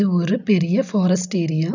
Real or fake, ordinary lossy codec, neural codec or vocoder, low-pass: real; none; none; 7.2 kHz